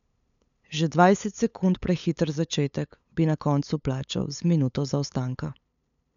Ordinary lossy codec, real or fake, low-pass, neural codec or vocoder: none; fake; 7.2 kHz; codec, 16 kHz, 8 kbps, FunCodec, trained on LibriTTS, 25 frames a second